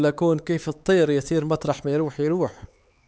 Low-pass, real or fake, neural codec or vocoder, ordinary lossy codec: none; fake; codec, 16 kHz, 4 kbps, X-Codec, HuBERT features, trained on LibriSpeech; none